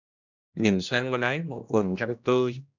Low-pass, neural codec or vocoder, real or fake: 7.2 kHz; codec, 16 kHz, 1 kbps, X-Codec, HuBERT features, trained on general audio; fake